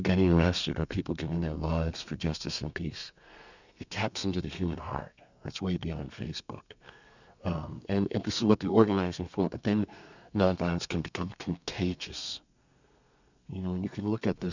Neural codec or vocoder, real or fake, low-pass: codec, 32 kHz, 1.9 kbps, SNAC; fake; 7.2 kHz